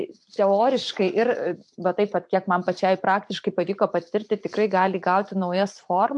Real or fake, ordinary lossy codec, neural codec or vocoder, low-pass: fake; MP3, 64 kbps; vocoder, 44.1 kHz, 128 mel bands every 512 samples, BigVGAN v2; 10.8 kHz